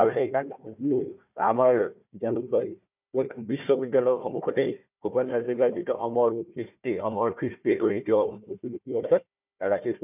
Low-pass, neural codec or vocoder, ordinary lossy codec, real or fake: 3.6 kHz; codec, 16 kHz, 1 kbps, FunCodec, trained on Chinese and English, 50 frames a second; none; fake